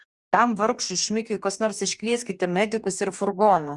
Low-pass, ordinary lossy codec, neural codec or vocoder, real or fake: 10.8 kHz; Opus, 64 kbps; codec, 44.1 kHz, 2.6 kbps, DAC; fake